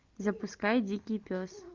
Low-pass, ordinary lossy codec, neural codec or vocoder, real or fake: 7.2 kHz; Opus, 24 kbps; none; real